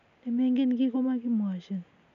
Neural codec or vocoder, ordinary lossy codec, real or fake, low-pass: none; none; real; 7.2 kHz